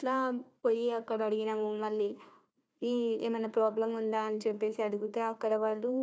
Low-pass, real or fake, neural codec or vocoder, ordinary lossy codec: none; fake; codec, 16 kHz, 1 kbps, FunCodec, trained on Chinese and English, 50 frames a second; none